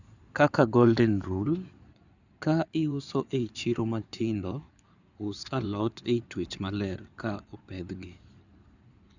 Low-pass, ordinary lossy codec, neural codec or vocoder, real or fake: 7.2 kHz; none; codec, 16 kHz in and 24 kHz out, 2.2 kbps, FireRedTTS-2 codec; fake